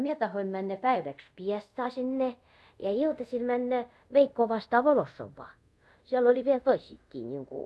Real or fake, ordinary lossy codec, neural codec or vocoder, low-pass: fake; none; codec, 24 kHz, 0.5 kbps, DualCodec; none